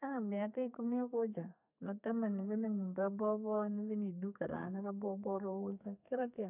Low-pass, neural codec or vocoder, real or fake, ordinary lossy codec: 3.6 kHz; codec, 32 kHz, 1.9 kbps, SNAC; fake; none